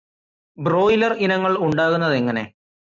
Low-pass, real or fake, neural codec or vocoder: 7.2 kHz; real; none